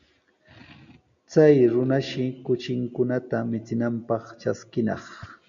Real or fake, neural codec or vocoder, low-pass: real; none; 7.2 kHz